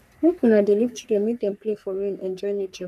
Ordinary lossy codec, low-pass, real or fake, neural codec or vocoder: none; 14.4 kHz; fake; codec, 44.1 kHz, 3.4 kbps, Pupu-Codec